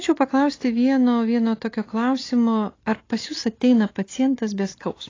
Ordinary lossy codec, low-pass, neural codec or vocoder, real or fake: AAC, 32 kbps; 7.2 kHz; none; real